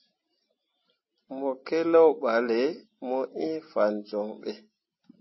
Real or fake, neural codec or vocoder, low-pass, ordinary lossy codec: real; none; 7.2 kHz; MP3, 24 kbps